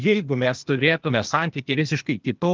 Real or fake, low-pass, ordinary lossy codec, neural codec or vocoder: fake; 7.2 kHz; Opus, 32 kbps; codec, 16 kHz, 0.8 kbps, ZipCodec